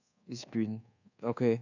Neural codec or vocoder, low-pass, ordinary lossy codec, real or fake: codec, 24 kHz, 1.2 kbps, DualCodec; 7.2 kHz; none; fake